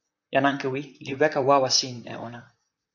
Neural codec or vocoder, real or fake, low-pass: vocoder, 44.1 kHz, 128 mel bands, Pupu-Vocoder; fake; 7.2 kHz